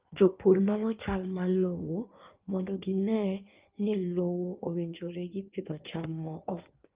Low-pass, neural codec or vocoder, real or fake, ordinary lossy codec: 3.6 kHz; codec, 16 kHz in and 24 kHz out, 1.1 kbps, FireRedTTS-2 codec; fake; Opus, 32 kbps